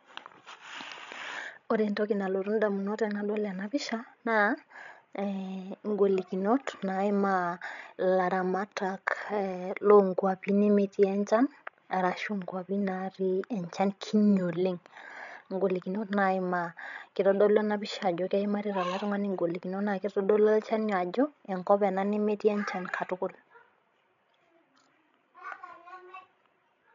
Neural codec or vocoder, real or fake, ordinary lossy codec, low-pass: codec, 16 kHz, 16 kbps, FreqCodec, larger model; fake; none; 7.2 kHz